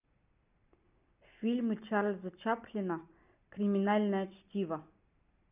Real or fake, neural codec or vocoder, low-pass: real; none; 3.6 kHz